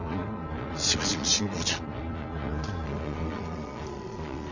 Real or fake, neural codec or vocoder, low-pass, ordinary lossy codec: fake; vocoder, 22.05 kHz, 80 mel bands, Vocos; 7.2 kHz; none